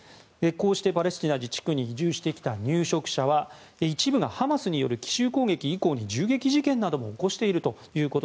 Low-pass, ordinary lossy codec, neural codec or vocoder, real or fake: none; none; none; real